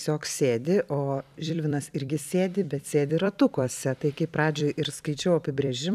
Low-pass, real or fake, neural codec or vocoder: 14.4 kHz; fake; vocoder, 44.1 kHz, 128 mel bands every 256 samples, BigVGAN v2